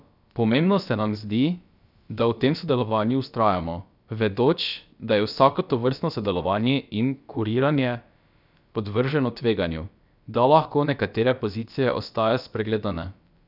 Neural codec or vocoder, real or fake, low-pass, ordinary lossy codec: codec, 16 kHz, about 1 kbps, DyCAST, with the encoder's durations; fake; 5.4 kHz; none